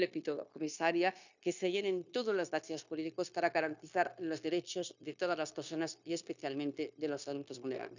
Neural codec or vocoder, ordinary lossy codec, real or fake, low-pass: codec, 16 kHz, 0.9 kbps, LongCat-Audio-Codec; none; fake; 7.2 kHz